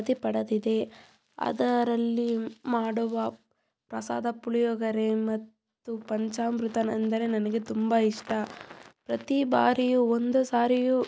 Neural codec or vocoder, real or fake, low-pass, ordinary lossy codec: none; real; none; none